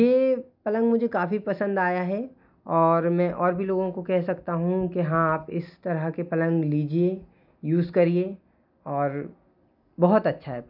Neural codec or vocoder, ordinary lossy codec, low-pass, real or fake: none; none; 5.4 kHz; real